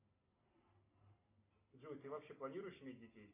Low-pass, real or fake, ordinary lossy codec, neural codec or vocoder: 3.6 kHz; real; AAC, 32 kbps; none